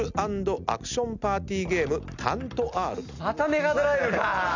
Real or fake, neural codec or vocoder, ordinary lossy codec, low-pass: real; none; none; 7.2 kHz